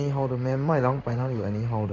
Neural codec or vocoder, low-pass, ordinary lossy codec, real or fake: codec, 16 kHz in and 24 kHz out, 1 kbps, XY-Tokenizer; 7.2 kHz; none; fake